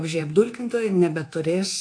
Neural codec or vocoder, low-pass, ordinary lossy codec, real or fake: codec, 24 kHz, 1.2 kbps, DualCodec; 9.9 kHz; AAC, 48 kbps; fake